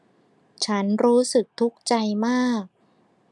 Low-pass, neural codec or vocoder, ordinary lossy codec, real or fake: none; none; none; real